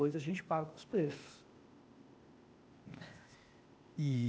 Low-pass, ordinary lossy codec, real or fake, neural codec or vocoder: none; none; fake; codec, 16 kHz, 0.8 kbps, ZipCodec